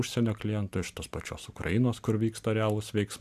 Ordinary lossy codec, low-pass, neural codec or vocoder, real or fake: MP3, 96 kbps; 14.4 kHz; none; real